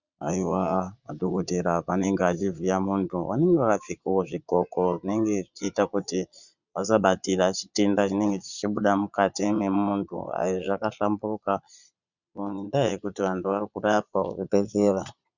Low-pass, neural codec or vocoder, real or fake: 7.2 kHz; vocoder, 22.05 kHz, 80 mel bands, Vocos; fake